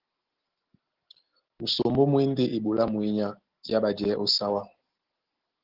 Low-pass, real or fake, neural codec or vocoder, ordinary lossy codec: 5.4 kHz; real; none; Opus, 16 kbps